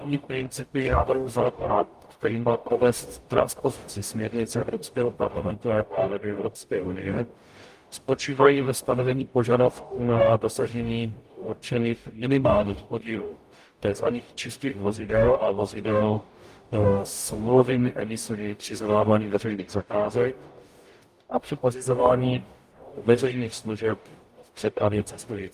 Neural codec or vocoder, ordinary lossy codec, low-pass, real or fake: codec, 44.1 kHz, 0.9 kbps, DAC; Opus, 32 kbps; 14.4 kHz; fake